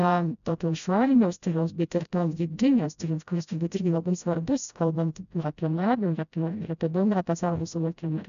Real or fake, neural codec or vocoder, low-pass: fake; codec, 16 kHz, 0.5 kbps, FreqCodec, smaller model; 7.2 kHz